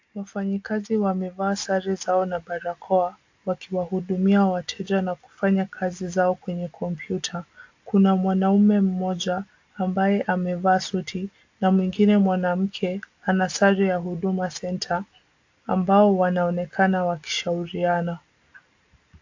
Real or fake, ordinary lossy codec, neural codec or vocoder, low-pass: real; AAC, 48 kbps; none; 7.2 kHz